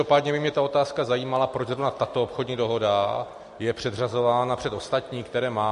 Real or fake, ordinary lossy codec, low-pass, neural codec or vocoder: real; MP3, 48 kbps; 14.4 kHz; none